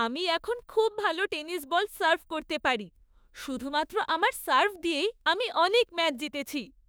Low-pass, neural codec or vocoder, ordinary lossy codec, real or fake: none; autoencoder, 48 kHz, 32 numbers a frame, DAC-VAE, trained on Japanese speech; none; fake